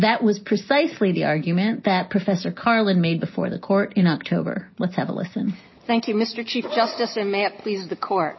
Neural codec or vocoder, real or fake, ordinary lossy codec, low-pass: none; real; MP3, 24 kbps; 7.2 kHz